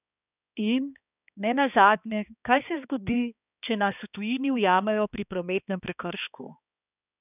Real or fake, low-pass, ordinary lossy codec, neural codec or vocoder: fake; 3.6 kHz; none; codec, 16 kHz, 2 kbps, X-Codec, HuBERT features, trained on balanced general audio